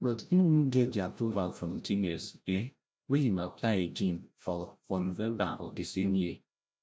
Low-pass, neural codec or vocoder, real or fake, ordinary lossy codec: none; codec, 16 kHz, 0.5 kbps, FreqCodec, larger model; fake; none